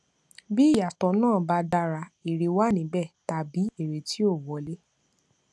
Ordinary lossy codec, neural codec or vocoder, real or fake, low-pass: none; none; real; none